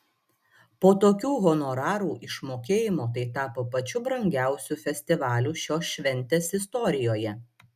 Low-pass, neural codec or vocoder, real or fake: 14.4 kHz; none; real